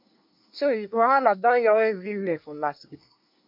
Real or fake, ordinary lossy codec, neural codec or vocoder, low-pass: fake; MP3, 48 kbps; codec, 24 kHz, 1 kbps, SNAC; 5.4 kHz